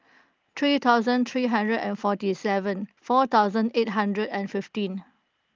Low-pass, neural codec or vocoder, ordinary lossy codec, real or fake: 7.2 kHz; none; Opus, 32 kbps; real